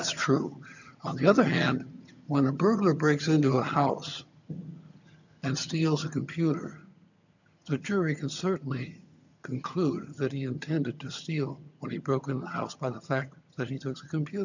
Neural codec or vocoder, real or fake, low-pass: vocoder, 22.05 kHz, 80 mel bands, HiFi-GAN; fake; 7.2 kHz